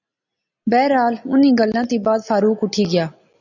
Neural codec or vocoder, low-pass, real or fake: none; 7.2 kHz; real